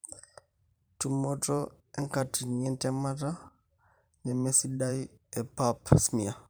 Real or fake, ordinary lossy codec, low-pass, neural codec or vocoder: fake; none; none; vocoder, 44.1 kHz, 128 mel bands every 512 samples, BigVGAN v2